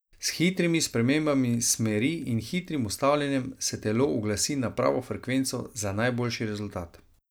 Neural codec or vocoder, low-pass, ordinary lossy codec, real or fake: none; none; none; real